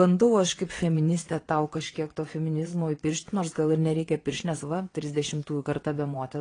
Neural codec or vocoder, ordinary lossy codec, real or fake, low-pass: vocoder, 22.05 kHz, 80 mel bands, WaveNeXt; AAC, 32 kbps; fake; 9.9 kHz